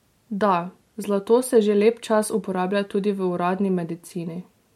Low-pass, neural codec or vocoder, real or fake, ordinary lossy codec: 19.8 kHz; none; real; MP3, 64 kbps